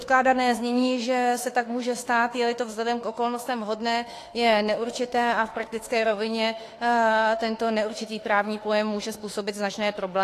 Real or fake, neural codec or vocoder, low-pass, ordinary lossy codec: fake; autoencoder, 48 kHz, 32 numbers a frame, DAC-VAE, trained on Japanese speech; 14.4 kHz; AAC, 48 kbps